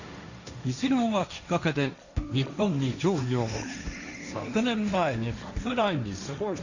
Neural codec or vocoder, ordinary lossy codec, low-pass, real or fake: codec, 16 kHz, 1.1 kbps, Voila-Tokenizer; none; 7.2 kHz; fake